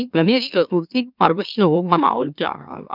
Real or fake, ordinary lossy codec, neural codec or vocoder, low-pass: fake; none; autoencoder, 44.1 kHz, a latent of 192 numbers a frame, MeloTTS; 5.4 kHz